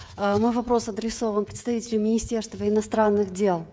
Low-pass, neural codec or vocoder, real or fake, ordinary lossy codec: none; codec, 16 kHz, 16 kbps, FreqCodec, smaller model; fake; none